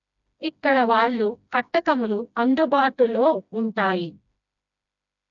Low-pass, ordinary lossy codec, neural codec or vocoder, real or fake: 7.2 kHz; none; codec, 16 kHz, 1 kbps, FreqCodec, smaller model; fake